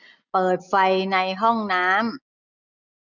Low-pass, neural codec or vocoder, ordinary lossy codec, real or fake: 7.2 kHz; none; none; real